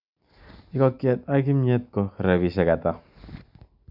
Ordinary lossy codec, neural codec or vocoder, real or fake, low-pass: none; none; real; 5.4 kHz